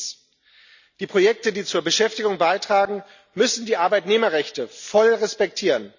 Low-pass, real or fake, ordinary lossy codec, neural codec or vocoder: 7.2 kHz; real; none; none